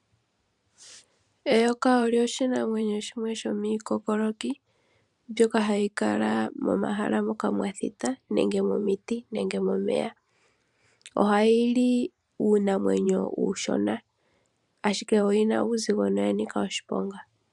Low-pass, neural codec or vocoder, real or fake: 10.8 kHz; vocoder, 44.1 kHz, 128 mel bands every 512 samples, BigVGAN v2; fake